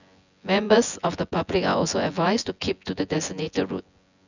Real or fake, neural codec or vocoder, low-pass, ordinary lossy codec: fake; vocoder, 24 kHz, 100 mel bands, Vocos; 7.2 kHz; none